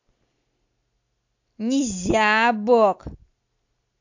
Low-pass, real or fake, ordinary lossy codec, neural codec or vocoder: 7.2 kHz; real; AAC, 48 kbps; none